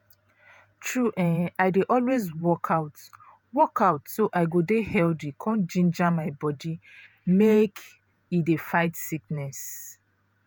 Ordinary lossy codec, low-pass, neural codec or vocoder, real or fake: none; none; vocoder, 48 kHz, 128 mel bands, Vocos; fake